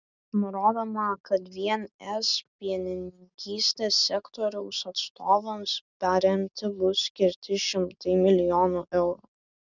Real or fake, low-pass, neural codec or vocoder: real; 7.2 kHz; none